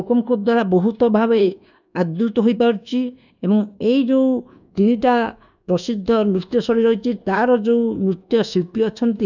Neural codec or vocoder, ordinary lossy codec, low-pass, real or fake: codec, 24 kHz, 1.2 kbps, DualCodec; none; 7.2 kHz; fake